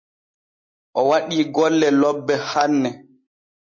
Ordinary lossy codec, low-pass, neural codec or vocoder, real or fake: MP3, 32 kbps; 7.2 kHz; none; real